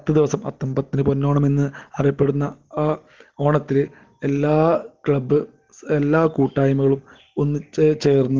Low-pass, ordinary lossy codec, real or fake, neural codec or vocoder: 7.2 kHz; Opus, 16 kbps; real; none